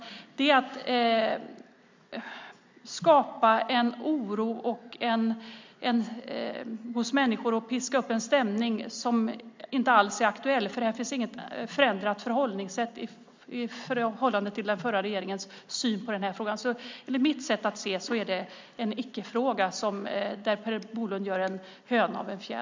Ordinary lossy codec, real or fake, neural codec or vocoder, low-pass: MP3, 64 kbps; real; none; 7.2 kHz